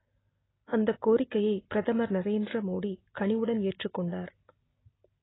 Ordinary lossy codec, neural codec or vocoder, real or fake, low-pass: AAC, 16 kbps; none; real; 7.2 kHz